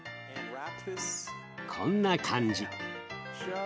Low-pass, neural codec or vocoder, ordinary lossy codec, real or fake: none; none; none; real